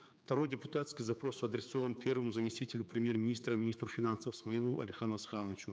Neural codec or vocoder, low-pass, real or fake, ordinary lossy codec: codec, 16 kHz, 4 kbps, X-Codec, HuBERT features, trained on general audio; none; fake; none